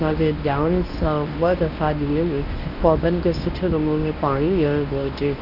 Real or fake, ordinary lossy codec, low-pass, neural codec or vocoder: fake; none; 5.4 kHz; codec, 24 kHz, 0.9 kbps, WavTokenizer, medium speech release version 1